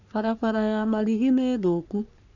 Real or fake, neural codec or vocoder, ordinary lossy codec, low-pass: fake; codec, 44.1 kHz, 3.4 kbps, Pupu-Codec; none; 7.2 kHz